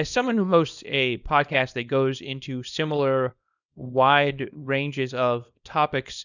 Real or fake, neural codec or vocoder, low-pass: fake; codec, 24 kHz, 0.9 kbps, WavTokenizer, small release; 7.2 kHz